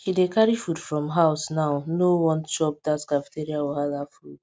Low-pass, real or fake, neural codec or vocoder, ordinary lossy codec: none; real; none; none